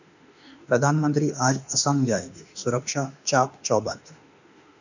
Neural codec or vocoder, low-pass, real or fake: autoencoder, 48 kHz, 32 numbers a frame, DAC-VAE, trained on Japanese speech; 7.2 kHz; fake